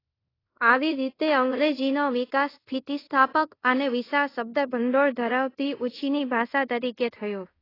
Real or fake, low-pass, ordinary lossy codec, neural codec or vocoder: fake; 5.4 kHz; AAC, 24 kbps; codec, 24 kHz, 0.5 kbps, DualCodec